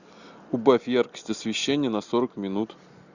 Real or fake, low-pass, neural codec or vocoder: real; 7.2 kHz; none